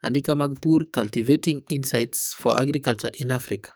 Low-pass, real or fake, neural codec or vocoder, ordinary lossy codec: none; fake; codec, 44.1 kHz, 2.6 kbps, SNAC; none